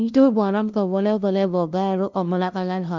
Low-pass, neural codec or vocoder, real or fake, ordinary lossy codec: 7.2 kHz; codec, 16 kHz, 0.5 kbps, FunCodec, trained on LibriTTS, 25 frames a second; fake; Opus, 32 kbps